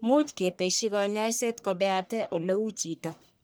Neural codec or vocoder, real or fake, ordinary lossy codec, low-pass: codec, 44.1 kHz, 1.7 kbps, Pupu-Codec; fake; none; none